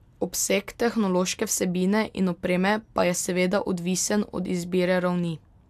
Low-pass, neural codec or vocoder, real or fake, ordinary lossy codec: 14.4 kHz; none; real; AAC, 96 kbps